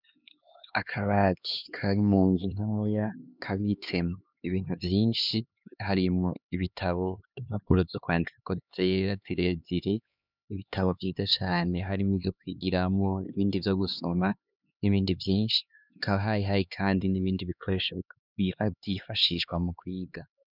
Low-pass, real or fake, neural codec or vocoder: 5.4 kHz; fake; codec, 16 kHz, 2 kbps, X-Codec, HuBERT features, trained on LibriSpeech